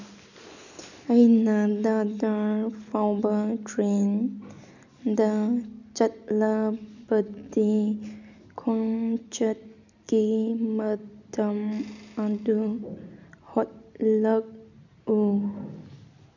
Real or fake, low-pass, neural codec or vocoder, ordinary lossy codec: real; 7.2 kHz; none; none